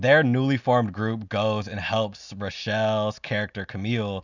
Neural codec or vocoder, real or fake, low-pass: none; real; 7.2 kHz